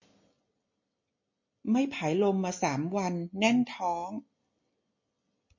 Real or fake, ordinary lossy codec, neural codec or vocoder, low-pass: real; MP3, 32 kbps; none; 7.2 kHz